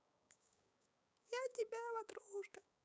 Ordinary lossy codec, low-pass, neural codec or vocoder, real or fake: none; none; codec, 16 kHz, 6 kbps, DAC; fake